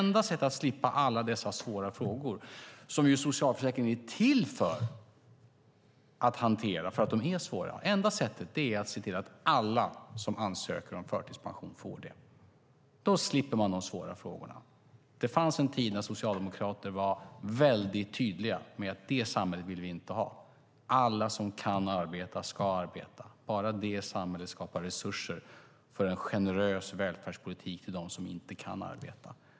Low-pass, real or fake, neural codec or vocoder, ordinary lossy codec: none; real; none; none